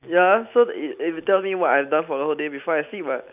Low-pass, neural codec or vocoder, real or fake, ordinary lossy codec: 3.6 kHz; none; real; none